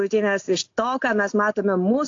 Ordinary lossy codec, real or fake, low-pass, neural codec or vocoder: AAC, 48 kbps; real; 7.2 kHz; none